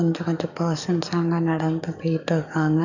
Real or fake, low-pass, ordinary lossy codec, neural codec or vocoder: fake; 7.2 kHz; none; codec, 44.1 kHz, 7.8 kbps, DAC